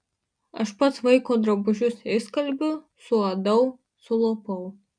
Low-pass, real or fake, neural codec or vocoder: 9.9 kHz; real; none